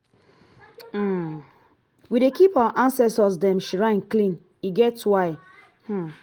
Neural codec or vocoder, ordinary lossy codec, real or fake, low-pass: none; Opus, 24 kbps; real; 19.8 kHz